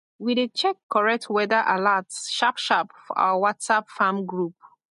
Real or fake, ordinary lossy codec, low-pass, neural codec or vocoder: real; MP3, 48 kbps; 14.4 kHz; none